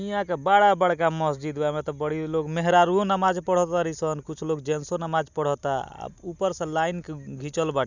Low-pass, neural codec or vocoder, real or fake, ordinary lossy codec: 7.2 kHz; none; real; none